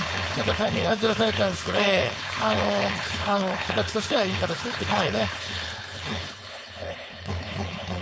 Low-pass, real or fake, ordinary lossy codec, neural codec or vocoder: none; fake; none; codec, 16 kHz, 4.8 kbps, FACodec